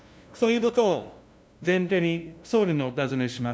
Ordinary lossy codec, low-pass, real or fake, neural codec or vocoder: none; none; fake; codec, 16 kHz, 0.5 kbps, FunCodec, trained on LibriTTS, 25 frames a second